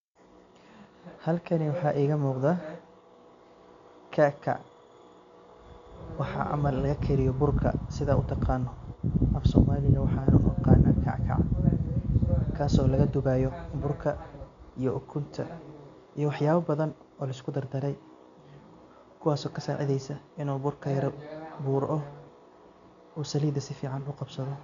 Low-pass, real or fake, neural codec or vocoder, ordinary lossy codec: 7.2 kHz; real; none; none